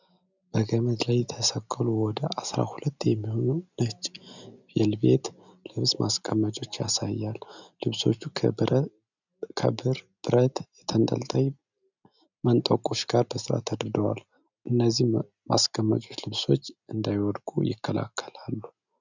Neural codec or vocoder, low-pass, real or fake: none; 7.2 kHz; real